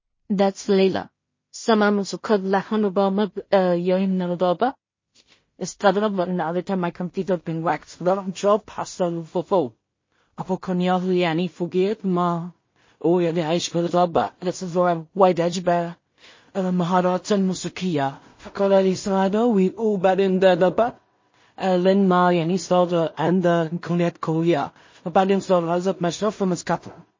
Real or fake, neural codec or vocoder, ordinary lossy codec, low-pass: fake; codec, 16 kHz in and 24 kHz out, 0.4 kbps, LongCat-Audio-Codec, two codebook decoder; MP3, 32 kbps; 7.2 kHz